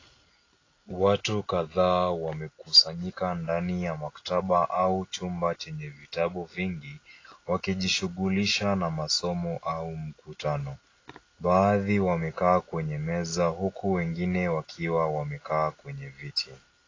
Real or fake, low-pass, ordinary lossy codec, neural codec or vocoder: real; 7.2 kHz; AAC, 32 kbps; none